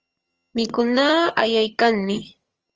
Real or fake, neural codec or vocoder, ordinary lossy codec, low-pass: fake; vocoder, 22.05 kHz, 80 mel bands, HiFi-GAN; Opus, 24 kbps; 7.2 kHz